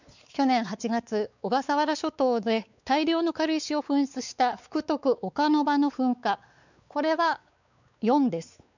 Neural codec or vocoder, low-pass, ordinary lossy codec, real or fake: codec, 16 kHz, 4 kbps, X-Codec, WavLM features, trained on Multilingual LibriSpeech; 7.2 kHz; none; fake